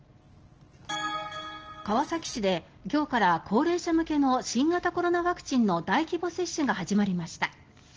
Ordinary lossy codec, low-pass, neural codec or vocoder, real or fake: Opus, 16 kbps; 7.2 kHz; none; real